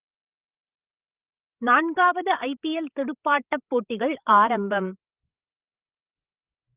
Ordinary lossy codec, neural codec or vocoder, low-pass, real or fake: Opus, 32 kbps; codec, 16 kHz, 8 kbps, FreqCodec, larger model; 3.6 kHz; fake